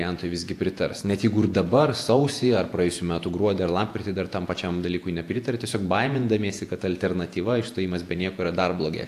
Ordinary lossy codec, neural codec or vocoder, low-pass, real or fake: AAC, 64 kbps; vocoder, 48 kHz, 128 mel bands, Vocos; 14.4 kHz; fake